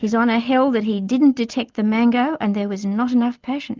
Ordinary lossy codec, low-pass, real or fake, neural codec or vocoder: Opus, 24 kbps; 7.2 kHz; real; none